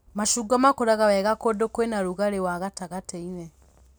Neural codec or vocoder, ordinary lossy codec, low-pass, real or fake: none; none; none; real